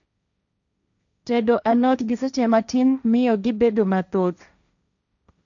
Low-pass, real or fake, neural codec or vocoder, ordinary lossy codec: 7.2 kHz; fake; codec, 16 kHz, 1.1 kbps, Voila-Tokenizer; none